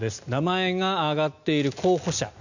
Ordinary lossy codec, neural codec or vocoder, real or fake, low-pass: none; none; real; 7.2 kHz